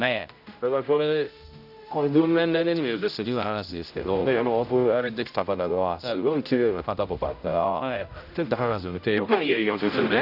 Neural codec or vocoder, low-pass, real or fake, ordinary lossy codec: codec, 16 kHz, 0.5 kbps, X-Codec, HuBERT features, trained on general audio; 5.4 kHz; fake; none